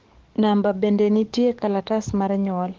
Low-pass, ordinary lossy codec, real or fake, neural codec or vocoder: 7.2 kHz; Opus, 16 kbps; fake; codec, 44.1 kHz, 7.8 kbps, DAC